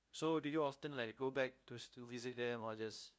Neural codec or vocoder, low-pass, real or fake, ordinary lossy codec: codec, 16 kHz, 0.5 kbps, FunCodec, trained on LibriTTS, 25 frames a second; none; fake; none